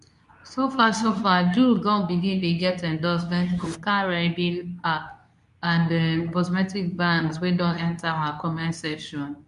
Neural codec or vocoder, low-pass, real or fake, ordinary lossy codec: codec, 24 kHz, 0.9 kbps, WavTokenizer, medium speech release version 2; 10.8 kHz; fake; none